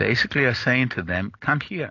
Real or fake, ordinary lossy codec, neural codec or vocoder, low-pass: real; AAC, 48 kbps; none; 7.2 kHz